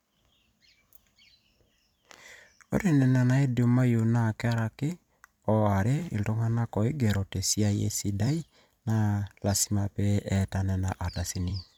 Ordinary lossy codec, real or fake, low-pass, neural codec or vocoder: none; fake; 19.8 kHz; vocoder, 44.1 kHz, 128 mel bands every 256 samples, BigVGAN v2